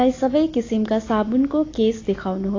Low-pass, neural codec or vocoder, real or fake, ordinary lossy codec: 7.2 kHz; none; real; AAC, 32 kbps